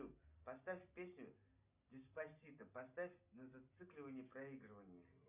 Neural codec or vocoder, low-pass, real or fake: none; 3.6 kHz; real